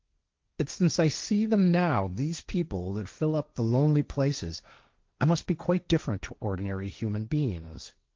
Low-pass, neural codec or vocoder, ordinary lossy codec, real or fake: 7.2 kHz; codec, 16 kHz, 1.1 kbps, Voila-Tokenizer; Opus, 24 kbps; fake